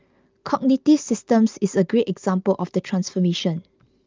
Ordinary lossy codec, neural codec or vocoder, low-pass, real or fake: Opus, 24 kbps; none; 7.2 kHz; real